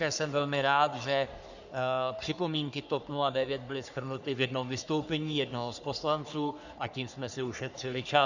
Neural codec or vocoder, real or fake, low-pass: codec, 44.1 kHz, 3.4 kbps, Pupu-Codec; fake; 7.2 kHz